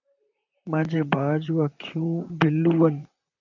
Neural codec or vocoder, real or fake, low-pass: vocoder, 44.1 kHz, 128 mel bands, Pupu-Vocoder; fake; 7.2 kHz